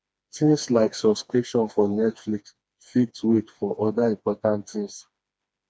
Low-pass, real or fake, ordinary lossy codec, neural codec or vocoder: none; fake; none; codec, 16 kHz, 2 kbps, FreqCodec, smaller model